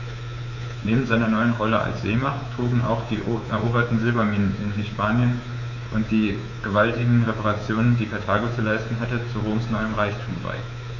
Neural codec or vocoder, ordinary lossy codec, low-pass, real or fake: codec, 24 kHz, 3.1 kbps, DualCodec; none; 7.2 kHz; fake